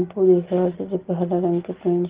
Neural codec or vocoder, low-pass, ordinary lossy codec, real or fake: none; 3.6 kHz; Opus, 16 kbps; real